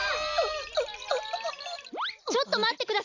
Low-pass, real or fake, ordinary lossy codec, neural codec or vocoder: 7.2 kHz; real; none; none